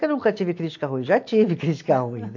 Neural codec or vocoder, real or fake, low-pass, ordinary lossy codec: none; real; 7.2 kHz; AAC, 48 kbps